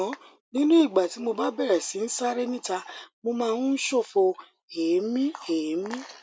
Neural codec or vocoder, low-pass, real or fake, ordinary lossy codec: none; none; real; none